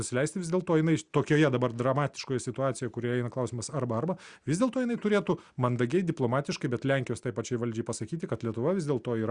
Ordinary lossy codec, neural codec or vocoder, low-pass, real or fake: Opus, 64 kbps; none; 9.9 kHz; real